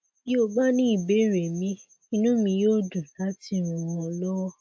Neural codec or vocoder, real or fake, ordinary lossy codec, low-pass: none; real; none; none